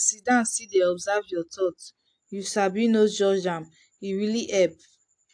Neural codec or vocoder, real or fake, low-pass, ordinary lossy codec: none; real; 9.9 kHz; AAC, 64 kbps